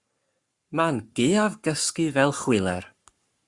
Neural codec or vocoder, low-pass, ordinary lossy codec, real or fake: codec, 44.1 kHz, 7.8 kbps, Pupu-Codec; 10.8 kHz; Opus, 64 kbps; fake